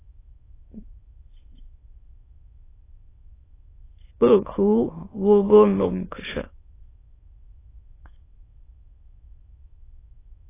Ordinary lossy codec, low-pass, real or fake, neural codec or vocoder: AAC, 16 kbps; 3.6 kHz; fake; autoencoder, 22.05 kHz, a latent of 192 numbers a frame, VITS, trained on many speakers